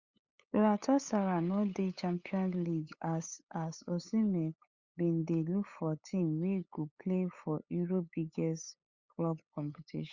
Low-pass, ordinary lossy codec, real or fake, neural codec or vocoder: 7.2 kHz; Opus, 64 kbps; fake; codec, 16 kHz, 8 kbps, FunCodec, trained on LibriTTS, 25 frames a second